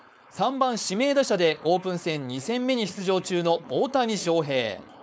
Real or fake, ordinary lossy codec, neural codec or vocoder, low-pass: fake; none; codec, 16 kHz, 4.8 kbps, FACodec; none